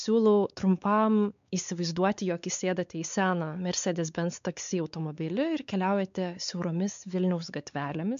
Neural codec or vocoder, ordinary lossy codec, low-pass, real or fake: codec, 16 kHz, 4 kbps, X-Codec, WavLM features, trained on Multilingual LibriSpeech; MP3, 64 kbps; 7.2 kHz; fake